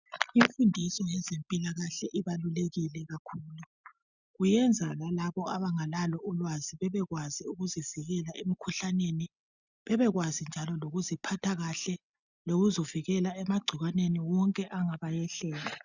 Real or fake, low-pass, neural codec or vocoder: real; 7.2 kHz; none